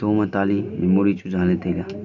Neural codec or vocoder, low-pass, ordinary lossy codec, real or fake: none; 7.2 kHz; none; real